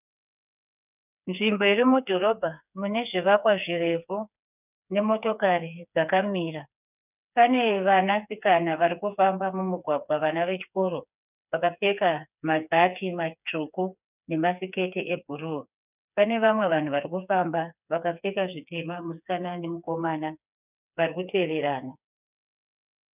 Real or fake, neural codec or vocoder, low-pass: fake; codec, 16 kHz, 4 kbps, FreqCodec, smaller model; 3.6 kHz